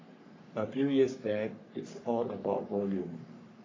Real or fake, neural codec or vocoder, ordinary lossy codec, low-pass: fake; codec, 44.1 kHz, 3.4 kbps, Pupu-Codec; AAC, 32 kbps; 7.2 kHz